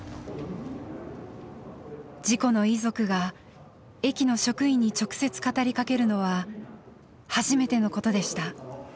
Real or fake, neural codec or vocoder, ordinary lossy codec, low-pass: real; none; none; none